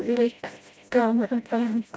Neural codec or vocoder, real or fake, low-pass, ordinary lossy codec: codec, 16 kHz, 0.5 kbps, FreqCodec, smaller model; fake; none; none